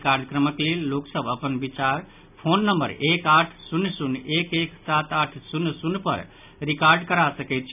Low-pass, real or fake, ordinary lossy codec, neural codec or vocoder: 3.6 kHz; real; none; none